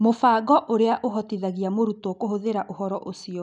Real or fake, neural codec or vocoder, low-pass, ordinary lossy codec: real; none; 9.9 kHz; none